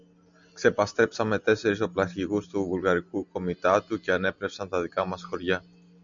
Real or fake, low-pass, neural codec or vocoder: real; 7.2 kHz; none